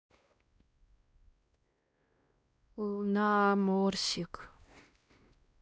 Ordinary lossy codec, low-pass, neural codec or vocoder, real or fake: none; none; codec, 16 kHz, 1 kbps, X-Codec, WavLM features, trained on Multilingual LibriSpeech; fake